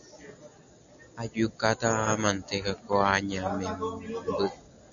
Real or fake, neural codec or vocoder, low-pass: real; none; 7.2 kHz